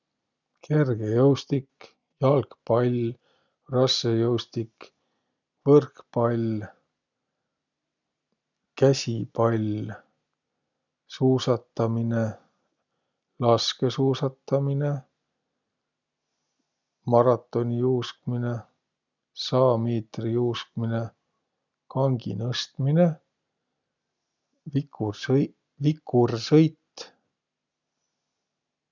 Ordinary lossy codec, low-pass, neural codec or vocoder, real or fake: none; 7.2 kHz; none; real